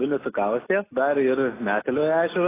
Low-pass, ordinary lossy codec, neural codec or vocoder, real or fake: 3.6 kHz; AAC, 16 kbps; none; real